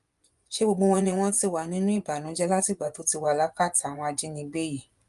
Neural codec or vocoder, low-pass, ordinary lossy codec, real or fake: vocoder, 24 kHz, 100 mel bands, Vocos; 10.8 kHz; Opus, 32 kbps; fake